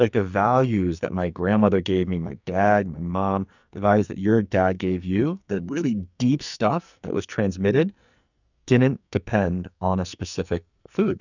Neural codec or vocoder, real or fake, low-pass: codec, 44.1 kHz, 2.6 kbps, SNAC; fake; 7.2 kHz